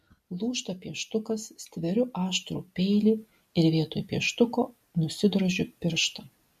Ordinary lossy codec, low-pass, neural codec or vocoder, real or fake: MP3, 64 kbps; 14.4 kHz; none; real